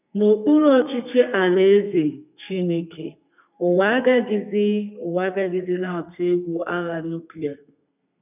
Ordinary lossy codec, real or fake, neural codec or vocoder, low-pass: none; fake; codec, 32 kHz, 1.9 kbps, SNAC; 3.6 kHz